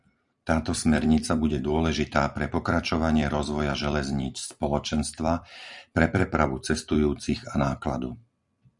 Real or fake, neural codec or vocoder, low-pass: fake; vocoder, 44.1 kHz, 128 mel bands every 256 samples, BigVGAN v2; 10.8 kHz